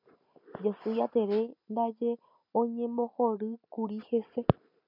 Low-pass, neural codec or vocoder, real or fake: 5.4 kHz; none; real